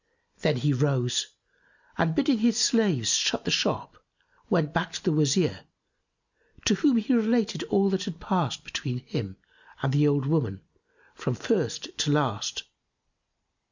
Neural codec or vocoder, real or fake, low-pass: none; real; 7.2 kHz